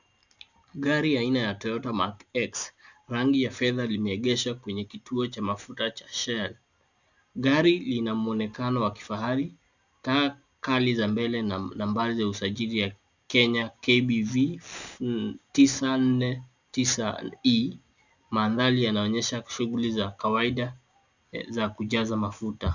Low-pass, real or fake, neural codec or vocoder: 7.2 kHz; real; none